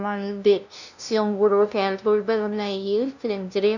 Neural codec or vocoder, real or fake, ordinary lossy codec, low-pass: codec, 16 kHz, 0.5 kbps, FunCodec, trained on LibriTTS, 25 frames a second; fake; none; 7.2 kHz